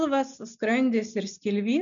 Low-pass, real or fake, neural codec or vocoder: 7.2 kHz; real; none